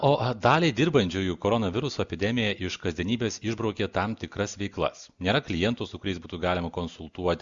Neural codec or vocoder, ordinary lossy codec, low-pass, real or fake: none; Opus, 64 kbps; 7.2 kHz; real